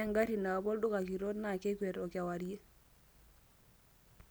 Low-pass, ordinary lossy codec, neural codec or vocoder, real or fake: none; none; none; real